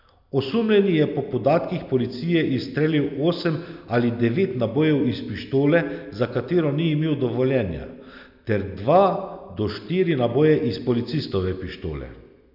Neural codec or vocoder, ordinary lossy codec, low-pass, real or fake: none; none; 5.4 kHz; real